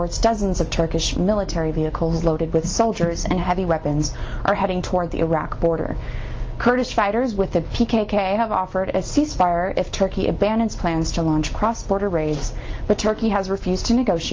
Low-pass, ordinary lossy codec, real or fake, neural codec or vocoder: 7.2 kHz; Opus, 24 kbps; real; none